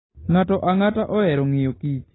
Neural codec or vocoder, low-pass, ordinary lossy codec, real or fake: none; 7.2 kHz; AAC, 16 kbps; real